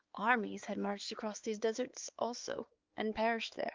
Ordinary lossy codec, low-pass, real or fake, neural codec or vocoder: Opus, 24 kbps; 7.2 kHz; fake; codec, 16 kHz, 4 kbps, X-Codec, HuBERT features, trained on balanced general audio